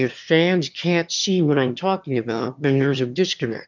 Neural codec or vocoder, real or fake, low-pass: autoencoder, 22.05 kHz, a latent of 192 numbers a frame, VITS, trained on one speaker; fake; 7.2 kHz